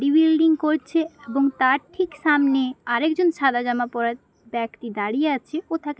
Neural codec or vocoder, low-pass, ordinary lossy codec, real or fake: none; none; none; real